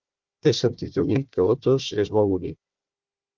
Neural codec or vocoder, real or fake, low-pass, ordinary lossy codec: codec, 16 kHz, 1 kbps, FunCodec, trained on Chinese and English, 50 frames a second; fake; 7.2 kHz; Opus, 32 kbps